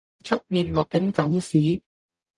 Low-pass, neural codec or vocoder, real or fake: 10.8 kHz; codec, 44.1 kHz, 0.9 kbps, DAC; fake